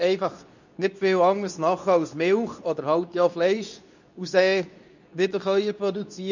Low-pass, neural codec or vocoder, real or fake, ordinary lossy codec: 7.2 kHz; codec, 24 kHz, 0.9 kbps, WavTokenizer, medium speech release version 2; fake; none